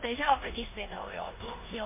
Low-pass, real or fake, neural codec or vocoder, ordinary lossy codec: 3.6 kHz; fake; codec, 16 kHz in and 24 kHz out, 0.9 kbps, LongCat-Audio-Codec, four codebook decoder; MP3, 24 kbps